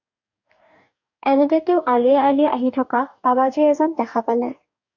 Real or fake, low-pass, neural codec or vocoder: fake; 7.2 kHz; codec, 44.1 kHz, 2.6 kbps, DAC